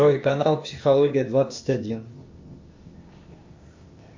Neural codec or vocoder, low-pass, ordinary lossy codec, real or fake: codec, 16 kHz, 0.8 kbps, ZipCodec; 7.2 kHz; MP3, 48 kbps; fake